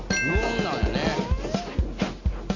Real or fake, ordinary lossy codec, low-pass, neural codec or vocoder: real; AAC, 48 kbps; 7.2 kHz; none